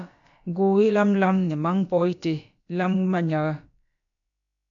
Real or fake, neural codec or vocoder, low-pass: fake; codec, 16 kHz, about 1 kbps, DyCAST, with the encoder's durations; 7.2 kHz